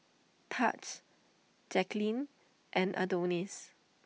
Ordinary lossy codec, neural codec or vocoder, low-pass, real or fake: none; none; none; real